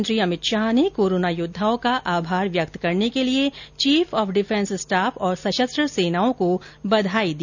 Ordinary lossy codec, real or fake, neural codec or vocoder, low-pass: none; real; none; 7.2 kHz